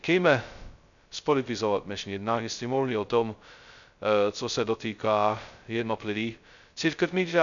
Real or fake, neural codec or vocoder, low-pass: fake; codec, 16 kHz, 0.2 kbps, FocalCodec; 7.2 kHz